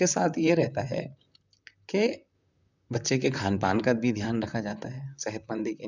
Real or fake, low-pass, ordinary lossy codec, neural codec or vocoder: fake; 7.2 kHz; none; vocoder, 44.1 kHz, 128 mel bands, Pupu-Vocoder